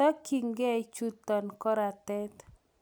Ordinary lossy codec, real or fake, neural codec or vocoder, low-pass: none; real; none; none